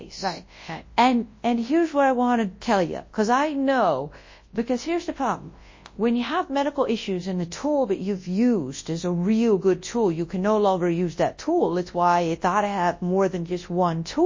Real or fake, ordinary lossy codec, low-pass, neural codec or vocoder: fake; MP3, 32 kbps; 7.2 kHz; codec, 24 kHz, 0.9 kbps, WavTokenizer, large speech release